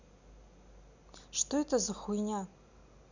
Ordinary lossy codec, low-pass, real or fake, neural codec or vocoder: none; 7.2 kHz; real; none